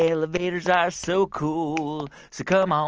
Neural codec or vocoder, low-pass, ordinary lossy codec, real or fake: none; 7.2 kHz; Opus, 24 kbps; real